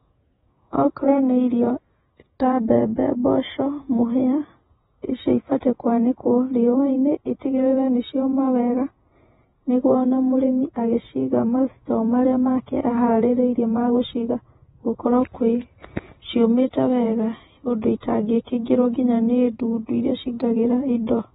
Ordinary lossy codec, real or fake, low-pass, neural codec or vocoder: AAC, 16 kbps; fake; 19.8 kHz; vocoder, 48 kHz, 128 mel bands, Vocos